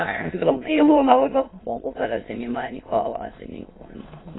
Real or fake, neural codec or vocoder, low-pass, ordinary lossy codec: fake; autoencoder, 22.05 kHz, a latent of 192 numbers a frame, VITS, trained on many speakers; 7.2 kHz; AAC, 16 kbps